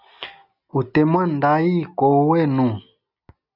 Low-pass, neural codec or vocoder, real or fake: 5.4 kHz; none; real